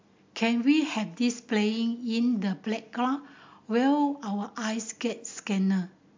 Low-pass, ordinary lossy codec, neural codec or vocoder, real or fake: 7.2 kHz; MP3, 64 kbps; none; real